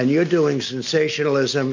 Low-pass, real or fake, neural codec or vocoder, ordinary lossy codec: 7.2 kHz; real; none; MP3, 48 kbps